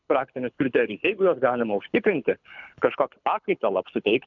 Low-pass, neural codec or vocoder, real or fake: 7.2 kHz; codec, 24 kHz, 6 kbps, HILCodec; fake